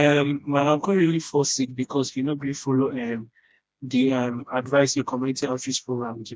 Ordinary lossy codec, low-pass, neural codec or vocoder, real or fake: none; none; codec, 16 kHz, 1 kbps, FreqCodec, smaller model; fake